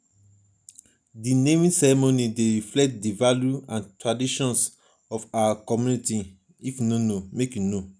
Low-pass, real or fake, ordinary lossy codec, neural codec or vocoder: 9.9 kHz; real; none; none